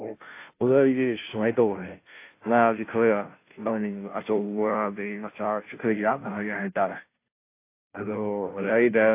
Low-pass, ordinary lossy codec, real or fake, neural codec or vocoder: 3.6 kHz; AAC, 24 kbps; fake; codec, 16 kHz, 0.5 kbps, FunCodec, trained on Chinese and English, 25 frames a second